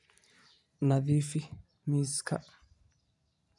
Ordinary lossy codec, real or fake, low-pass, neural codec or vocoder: none; fake; 10.8 kHz; vocoder, 44.1 kHz, 128 mel bands every 256 samples, BigVGAN v2